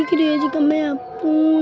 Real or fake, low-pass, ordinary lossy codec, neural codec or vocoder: real; none; none; none